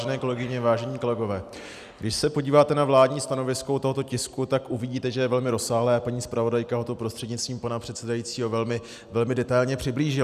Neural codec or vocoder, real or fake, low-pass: none; real; 14.4 kHz